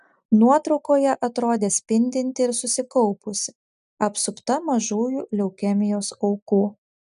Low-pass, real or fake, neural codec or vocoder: 10.8 kHz; real; none